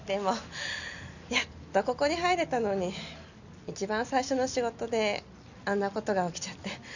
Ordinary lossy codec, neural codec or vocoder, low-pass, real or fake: none; none; 7.2 kHz; real